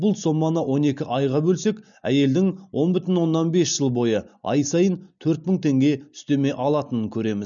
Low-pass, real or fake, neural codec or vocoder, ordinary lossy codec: 7.2 kHz; real; none; none